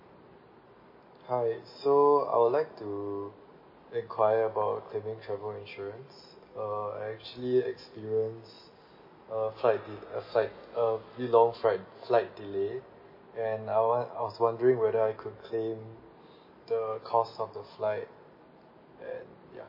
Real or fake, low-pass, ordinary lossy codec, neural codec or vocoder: real; 5.4 kHz; MP3, 24 kbps; none